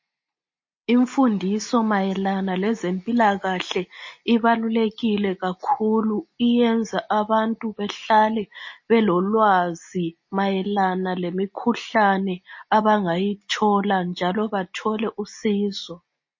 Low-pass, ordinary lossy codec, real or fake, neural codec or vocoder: 7.2 kHz; MP3, 32 kbps; real; none